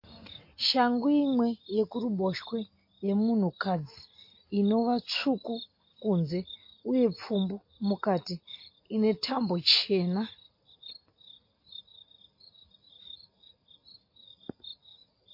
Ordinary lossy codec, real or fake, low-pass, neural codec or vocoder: MP3, 32 kbps; real; 5.4 kHz; none